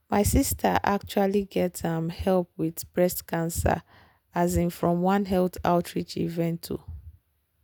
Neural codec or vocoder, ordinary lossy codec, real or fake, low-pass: none; none; real; none